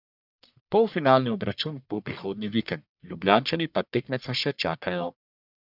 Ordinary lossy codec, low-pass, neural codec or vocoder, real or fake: none; 5.4 kHz; codec, 44.1 kHz, 1.7 kbps, Pupu-Codec; fake